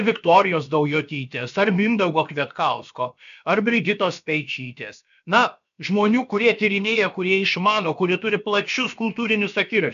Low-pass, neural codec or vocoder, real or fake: 7.2 kHz; codec, 16 kHz, about 1 kbps, DyCAST, with the encoder's durations; fake